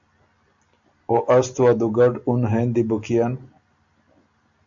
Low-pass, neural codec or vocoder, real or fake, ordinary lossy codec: 7.2 kHz; none; real; AAC, 64 kbps